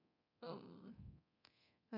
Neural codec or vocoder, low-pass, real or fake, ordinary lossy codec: codec, 24 kHz, 0.9 kbps, DualCodec; 5.4 kHz; fake; none